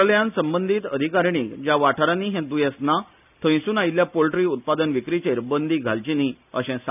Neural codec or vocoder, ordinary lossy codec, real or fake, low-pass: none; none; real; 3.6 kHz